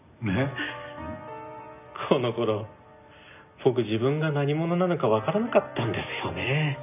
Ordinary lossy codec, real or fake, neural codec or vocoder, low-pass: none; real; none; 3.6 kHz